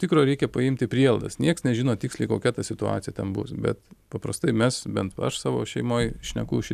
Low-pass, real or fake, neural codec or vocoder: 14.4 kHz; real; none